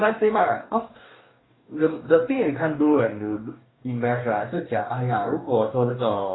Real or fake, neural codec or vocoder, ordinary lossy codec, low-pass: fake; codec, 44.1 kHz, 2.6 kbps, DAC; AAC, 16 kbps; 7.2 kHz